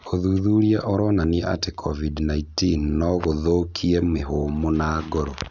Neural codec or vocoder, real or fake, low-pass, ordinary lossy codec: none; real; 7.2 kHz; none